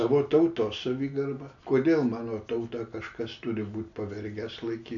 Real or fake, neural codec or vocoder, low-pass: real; none; 7.2 kHz